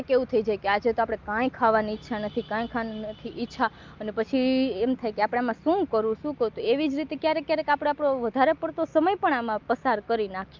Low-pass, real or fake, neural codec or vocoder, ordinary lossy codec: 7.2 kHz; real; none; Opus, 24 kbps